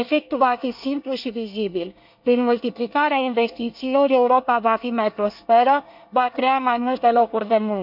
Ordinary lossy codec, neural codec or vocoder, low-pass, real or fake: none; codec, 24 kHz, 1 kbps, SNAC; 5.4 kHz; fake